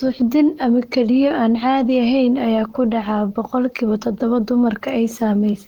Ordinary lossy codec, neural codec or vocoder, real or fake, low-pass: Opus, 24 kbps; vocoder, 44.1 kHz, 128 mel bands, Pupu-Vocoder; fake; 19.8 kHz